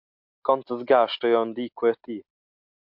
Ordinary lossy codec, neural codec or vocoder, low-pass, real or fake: MP3, 48 kbps; none; 5.4 kHz; real